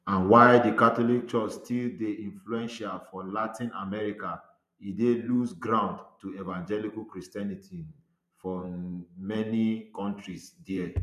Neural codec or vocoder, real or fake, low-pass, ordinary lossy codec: vocoder, 44.1 kHz, 128 mel bands every 512 samples, BigVGAN v2; fake; 14.4 kHz; none